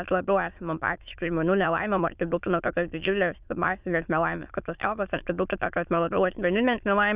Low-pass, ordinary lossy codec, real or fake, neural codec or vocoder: 3.6 kHz; Opus, 64 kbps; fake; autoencoder, 22.05 kHz, a latent of 192 numbers a frame, VITS, trained on many speakers